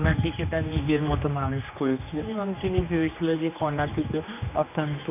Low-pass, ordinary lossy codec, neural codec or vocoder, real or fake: 3.6 kHz; none; codec, 16 kHz, 2 kbps, X-Codec, HuBERT features, trained on general audio; fake